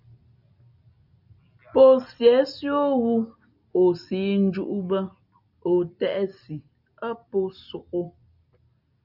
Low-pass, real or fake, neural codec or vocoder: 5.4 kHz; real; none